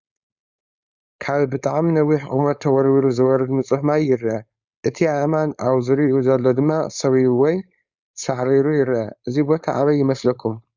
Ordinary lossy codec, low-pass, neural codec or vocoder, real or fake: Opus, 64 kbps; 7.2 kHz; codec, 16 kHz, 4.8 kbps, FACodec; fake